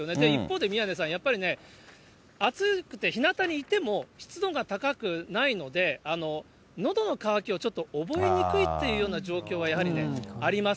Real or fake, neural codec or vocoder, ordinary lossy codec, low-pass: real; none; none; none